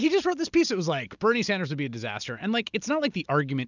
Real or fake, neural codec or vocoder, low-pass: real; none; 7.2 kHz